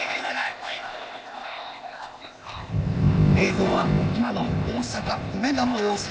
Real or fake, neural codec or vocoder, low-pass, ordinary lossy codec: fake; codec, 16 kHz, 0.8 kbps, ZipCodec; none; none